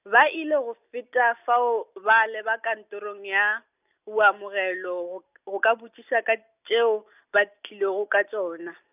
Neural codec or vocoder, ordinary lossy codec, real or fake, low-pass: none; none; real; 3.6 kHz